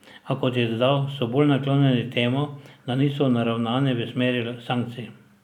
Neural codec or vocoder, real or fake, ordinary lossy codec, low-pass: none; real; none; 19.8 kHz